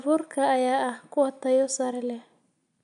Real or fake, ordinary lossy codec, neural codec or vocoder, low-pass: real; none; none; 10.8 kHz